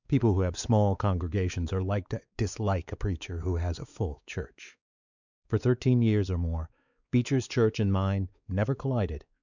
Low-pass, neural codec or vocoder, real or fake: 7.2 kHz; codec, 16 kHz, 4 kbps, X-Codec, WavLM features, trained on Multilingual LibriSpeech; fake